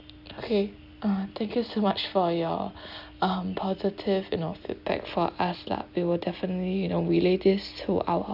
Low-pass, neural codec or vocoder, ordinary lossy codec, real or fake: 5.4 kHz; none; none; real